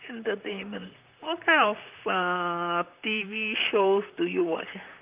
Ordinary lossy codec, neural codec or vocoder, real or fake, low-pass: Opus, 32 kbps; vocoder, 44.1 kHz, 128 mel bands, Pupu-Vocoder; fake; 3.6 kHz